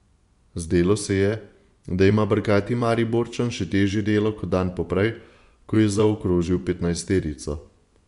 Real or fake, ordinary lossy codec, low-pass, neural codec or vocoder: fake; none; 10.8 kHz; vocoder, 24 kHz, 100 mel bands, Vocos